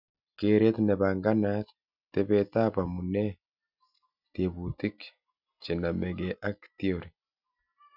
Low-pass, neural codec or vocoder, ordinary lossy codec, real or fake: 5.4 kHz; none; none; real